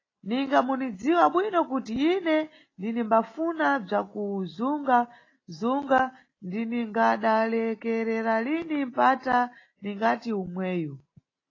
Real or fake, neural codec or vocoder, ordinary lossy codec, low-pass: real; none; AAC, 32 kbps; 7.2 kHz